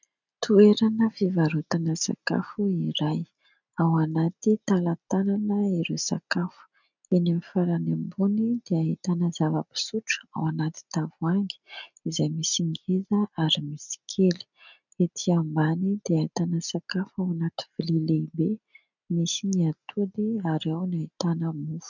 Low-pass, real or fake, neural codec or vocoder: 7.2 kHz; real; none